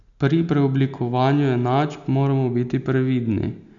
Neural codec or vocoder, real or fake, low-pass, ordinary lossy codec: none; real; 7.2 kHz; none